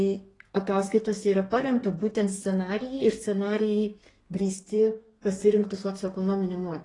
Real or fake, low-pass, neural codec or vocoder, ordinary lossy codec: fake; 10.8 kHz; codec, 32 kHz, 1.9 kbps, SNAC; AAC, 32 kbps